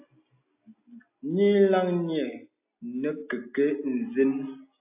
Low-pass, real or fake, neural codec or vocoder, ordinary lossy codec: 3.6 kHz; real; none; AAC, 32 kbps